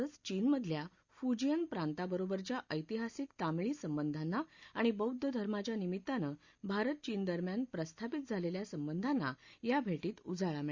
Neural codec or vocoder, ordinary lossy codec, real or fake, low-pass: none; Opus, 64 kbps; real; 7.2 kHz